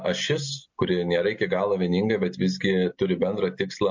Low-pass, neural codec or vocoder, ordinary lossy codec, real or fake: 7.2 kHz; none; MP3, 48 kbps; real